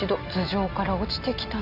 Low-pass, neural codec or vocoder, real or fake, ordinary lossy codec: 5.4 kHz; none; real; none